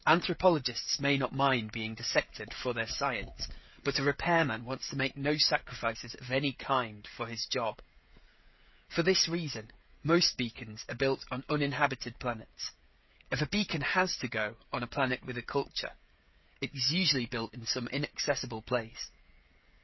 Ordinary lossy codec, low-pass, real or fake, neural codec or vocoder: MP3, 24 kbps; 7.2 kHz; fake; codec, 16 kHz, 16 kbps, FreqCodec, smaller model